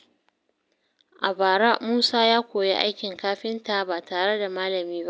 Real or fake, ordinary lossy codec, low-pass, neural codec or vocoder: real; none; none; none